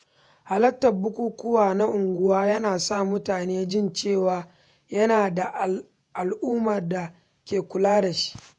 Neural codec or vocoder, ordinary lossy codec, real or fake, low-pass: vocoder, 48 kHz, 128 mel bands, Vocos; none; fake; 10.8 kHz